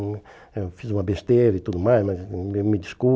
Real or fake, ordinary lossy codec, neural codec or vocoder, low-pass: real; none; none; none